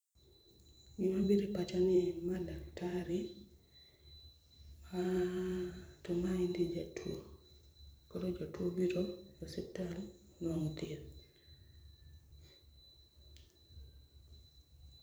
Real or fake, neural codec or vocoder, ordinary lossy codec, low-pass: fake; vocoder, 44.1 kHz, 128 mel bands every 512 samples, BigVGAN v2; none; none